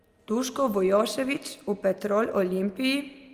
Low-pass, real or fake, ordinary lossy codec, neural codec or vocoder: 14.4 kHz; real; Opus, 32 kbps; none